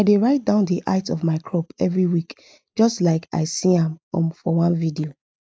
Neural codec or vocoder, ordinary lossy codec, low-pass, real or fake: none; none; none; real